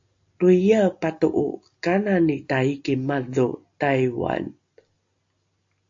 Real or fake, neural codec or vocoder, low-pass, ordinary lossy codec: real; none; 7.2 kHz; AAC, 48 kbps